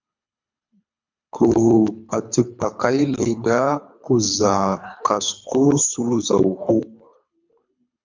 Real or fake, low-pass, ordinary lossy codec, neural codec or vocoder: fake; 7.2 kHz; MP3, 64 kbps; codec, 24 kHz, 3 kbps, HILCodec